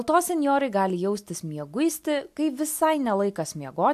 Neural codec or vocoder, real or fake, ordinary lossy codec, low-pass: none; real; AAC, 96 kbps; 14.4 kHz